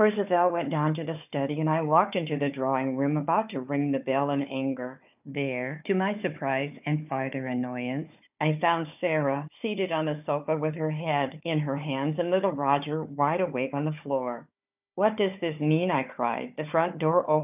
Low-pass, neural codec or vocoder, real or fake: 3.6 kHz; codec, 16 kHz, 4 kbps, FunCodec, trained on Chinese and English, 50 frames a second; fake